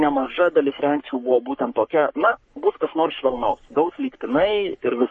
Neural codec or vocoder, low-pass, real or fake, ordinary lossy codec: codec, 44.1 kHz, 3.4 kbps, Pupu-Codec; 10.8 kHz; fake; MP3, 32 kbps